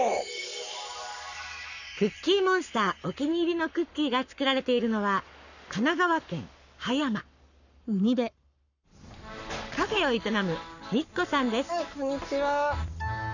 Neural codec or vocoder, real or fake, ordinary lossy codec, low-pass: codec, 44.1 kHz, 7.8 kbps, Pupu-Codec; fake; none; 7.2 kHz